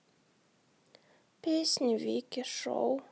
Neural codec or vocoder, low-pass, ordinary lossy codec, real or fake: none; none; none; real